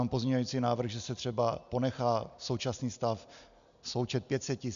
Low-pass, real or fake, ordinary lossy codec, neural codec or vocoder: 7.2 kHz; real; MP3, 96 kbps; none